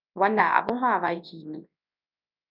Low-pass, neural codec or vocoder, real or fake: 5.4 kHz; codec, 24 kHz, 0.9 kbps, WavTokenizer, large speech release; fake